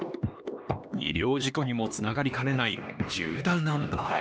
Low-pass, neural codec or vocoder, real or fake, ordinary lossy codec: none; codec, 16 kHz, 2 kbps, X-Codec, HuBERT features, trained on LibriSpeech; fake; none